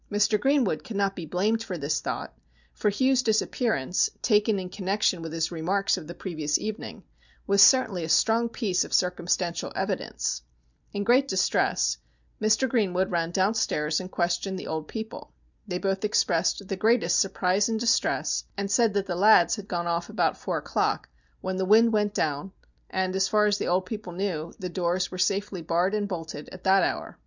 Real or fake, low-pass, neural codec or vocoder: real; 7.2 kHz; none